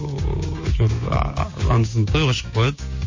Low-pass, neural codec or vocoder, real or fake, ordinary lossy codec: 7.2 kHz; codec, 16 kHz, 6 kbps, DAC; fake; MP3, 32 kbps